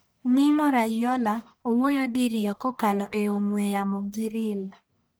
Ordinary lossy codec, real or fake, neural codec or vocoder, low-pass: none; fake; codec, 44.1 kHz, 1.7 kbps, Pupu-Codec; none